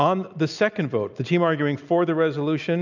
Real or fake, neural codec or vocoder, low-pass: real; none; 7.2 kHz